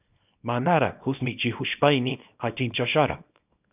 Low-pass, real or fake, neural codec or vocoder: 3.6 kHz; fake; codec, 24 kHz, 0.9 kbps, WavTokenizer, small release